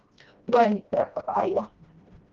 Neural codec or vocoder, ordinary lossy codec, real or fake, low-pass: codec, 16 kHz, 1 kbps, FreqCodec, smaller model; Opus, 32 kbps; fake; 7.2 kHz